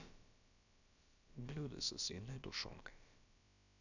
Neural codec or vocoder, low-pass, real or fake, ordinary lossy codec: codec, 16 kHz, about 1 kbps, DyCAST, with the encoder's durations; 7.2 kHz; fake; none